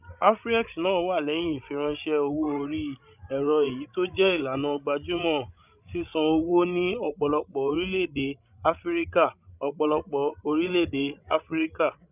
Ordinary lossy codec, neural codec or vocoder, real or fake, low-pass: none; codec, 16 kHz, 16 kbps, FreqCodec, larger model; fake; 3.6 kHz